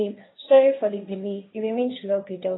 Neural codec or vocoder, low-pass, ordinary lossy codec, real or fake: codec, 16 kHz, 1.1 kbps, Voila-Tokenizer; 7.2 kHz; AAC, 16 kbps; fake